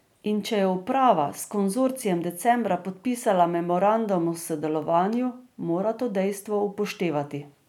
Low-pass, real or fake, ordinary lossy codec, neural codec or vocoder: 19.8 kHz; real; none; none